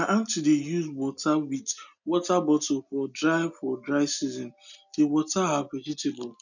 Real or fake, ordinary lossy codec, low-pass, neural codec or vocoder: real; none; 7.2 kHz; none